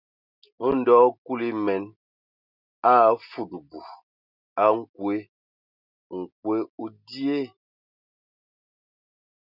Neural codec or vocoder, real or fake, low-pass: none; real; 5.4 kHz